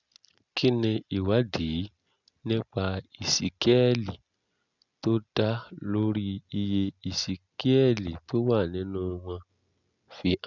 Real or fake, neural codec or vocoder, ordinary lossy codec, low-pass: real; none; none; 7.2 kHz